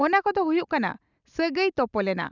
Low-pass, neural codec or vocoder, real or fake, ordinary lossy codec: 7.2 kHz; none; real; none